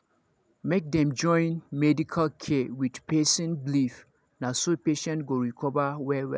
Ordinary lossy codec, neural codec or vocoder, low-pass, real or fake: none; none; none; real